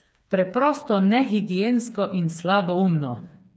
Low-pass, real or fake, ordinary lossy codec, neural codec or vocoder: none; fake; none; codec, 16 kHz, 4 kbps, FreqCodec, smaller model